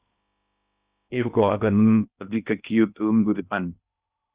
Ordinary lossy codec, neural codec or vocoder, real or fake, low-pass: Opus, 64 kbps; codec, 16 kHz in and 24 kHz out, 0.6 kbps, FocalCodec, streaming, 2048 codes; fake; 3.6 kHz